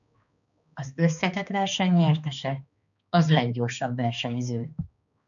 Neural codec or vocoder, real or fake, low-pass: codec, 16 kHz, 2 kbps, X-Codec, HuBERT features, trained on balanced general audio; fake; 7.2 kHz